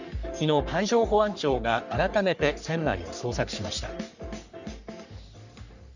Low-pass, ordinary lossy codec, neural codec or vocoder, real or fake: 7.2 kHz; none; codec, 44.1 kHz, 3.4 kbps, Pupu-Codec; fake